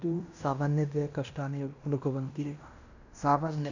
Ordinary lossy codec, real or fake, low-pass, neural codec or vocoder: none; fake; 7.2 kHz; codec, 16 kHz in and 24 kHz out, 0.9 kbps, LongCat-Audio-Codec, fine tuned four codebook decoder